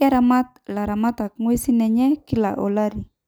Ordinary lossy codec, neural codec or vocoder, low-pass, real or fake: none; none; none; real